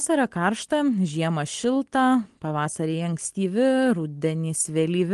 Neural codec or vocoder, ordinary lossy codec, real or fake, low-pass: none; Opus, 24 kbps; real; 10.8 kHz